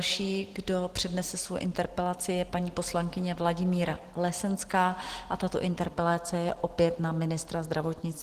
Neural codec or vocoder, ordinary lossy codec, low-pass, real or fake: none; Opus, 16 kbps; 14.4 kHz; real